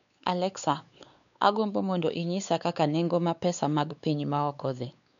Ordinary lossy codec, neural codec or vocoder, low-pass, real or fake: none; codec, 16 kHz, 4 kbps, X-Codec, WavLM features, trained on Multilingual LibriSpeech; 7.2 kHz; fake